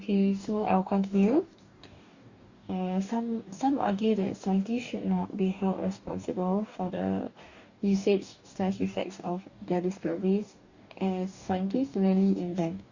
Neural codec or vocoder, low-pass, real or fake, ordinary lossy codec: codec, 44.1 kHz, 2.6 kbps, DAC; 7.2 kHz; fake; Opus, 64 kbps